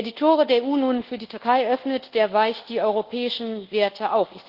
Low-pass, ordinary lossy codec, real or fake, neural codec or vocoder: 5.4 kHz; Opus, 16 kbps; fake; codec, 24 kHz, 1.2 kbps, DualCodec